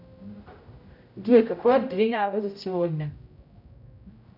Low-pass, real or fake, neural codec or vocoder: 5.4 kHz; fake; codec, 16 kHz, 0.5 kbps, X-Codec, HuBERT features, trained on general audio